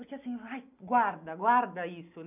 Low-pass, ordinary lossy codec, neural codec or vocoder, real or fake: 3.6 kHz; none; none; real